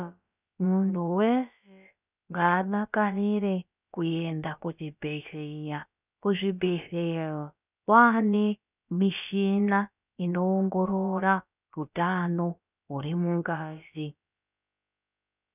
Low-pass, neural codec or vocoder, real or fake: 3.6 kHz; codec, 16 kHz, about 1 kbps, DyCAST, with the encoder's durations; fake